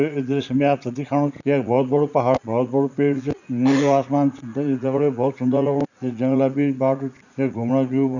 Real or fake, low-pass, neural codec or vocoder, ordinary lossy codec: fake; 7.2 kHz; vocoder, 44.1 kHz, 80 mel bands, Vocos; none